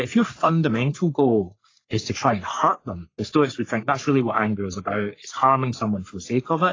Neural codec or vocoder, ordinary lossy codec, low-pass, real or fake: codec, 44.1 kHz, 3.4 kbps, Pupu-Codec; AAC, 32 kbps; 7.2 kHz; fake